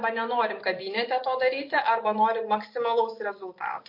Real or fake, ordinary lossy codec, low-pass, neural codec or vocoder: real; MP3, 32 kbps; 5.4 kHz; none